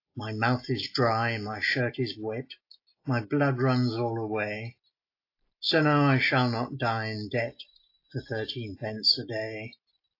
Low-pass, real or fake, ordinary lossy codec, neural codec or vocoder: 5.4 kHz; real; AAC, 32 kbps; none